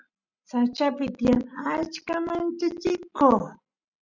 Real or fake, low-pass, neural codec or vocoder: real; 7.2 kHz; none